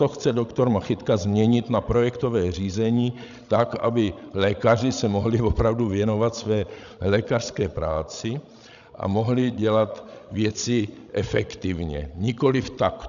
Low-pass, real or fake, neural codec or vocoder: 7.2 kHz; fake; codec, 16 kHz, 16 kbps, FreqCodec, larger model